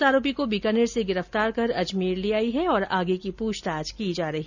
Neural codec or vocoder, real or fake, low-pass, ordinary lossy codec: none; real; 7.2 kHz; none